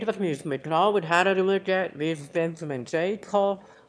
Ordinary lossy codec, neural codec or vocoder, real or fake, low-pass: none; autoencoder, 22.05 kHz, a latent of 192 numbers a frame, VITS, trained on one speaker; fake; none